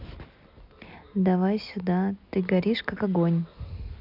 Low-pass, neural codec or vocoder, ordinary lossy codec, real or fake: 5.4 kHz; none; none; real